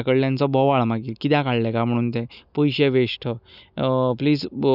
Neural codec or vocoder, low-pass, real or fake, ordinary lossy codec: none; 5.4 kHz; real; none